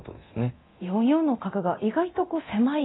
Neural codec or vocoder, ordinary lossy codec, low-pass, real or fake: codec, 24 kHz, 0.9 kbps, DualCodec; AAC, 16 kbps; 7.2 kHz; fake